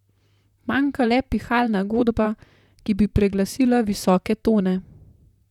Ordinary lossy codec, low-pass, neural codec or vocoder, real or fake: none; 19.8 kHz; vocoder, 44.1 kHz, 128 mel bands, Pupu-Vocoder; fake